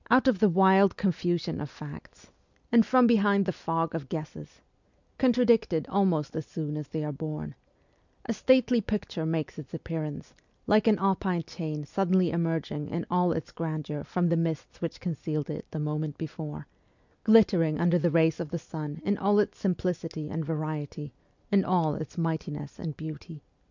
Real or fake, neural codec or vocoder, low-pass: real; none; 7.2 kHz